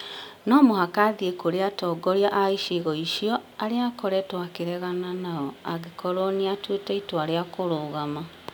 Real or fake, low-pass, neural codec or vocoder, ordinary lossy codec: real; none; none; none